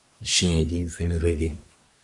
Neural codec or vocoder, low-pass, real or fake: codec, 24 kHz, 1 kbps, SNAC; 10.8 kHz; fake